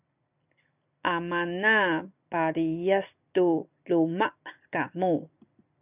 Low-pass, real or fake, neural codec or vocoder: 3.6 kHz; real; none